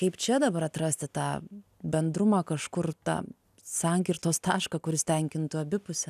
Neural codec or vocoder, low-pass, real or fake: none; 14.4 kHz; real